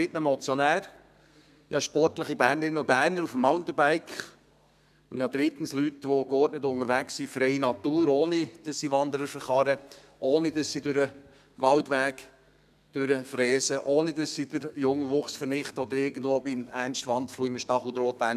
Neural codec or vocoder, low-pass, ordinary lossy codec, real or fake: codec, 32 kHz, 1.9 kbps, SNAC; 14.4 kHz; none; fake